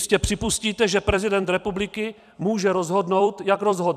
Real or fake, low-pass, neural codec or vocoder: fake; 14.4 kHz; vocoder, 44.1 kHz, 128 mel bands every 256 samples, BigVGAN v2